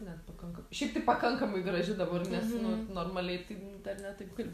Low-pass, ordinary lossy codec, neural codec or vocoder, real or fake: 14.4 kHz; MP3, 64 kbps; none; real